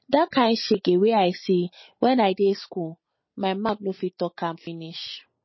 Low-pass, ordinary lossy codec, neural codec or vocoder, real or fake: 7.2 kHz; MP3, 24 kbps; none; real